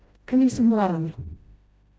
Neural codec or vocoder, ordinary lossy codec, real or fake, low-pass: codec, 16 kHz, 0.5 kbps, FreqCodec, smaller model; none; fake; none